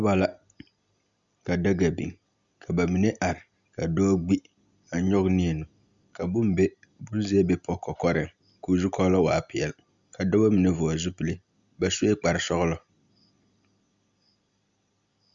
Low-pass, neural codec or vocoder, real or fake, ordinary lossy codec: 7.2 kHz; none; real; Opus, 64 kbps